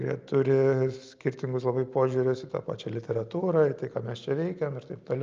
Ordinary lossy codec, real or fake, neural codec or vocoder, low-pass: Opus, 24 kbps; real; none; 7.2 kHz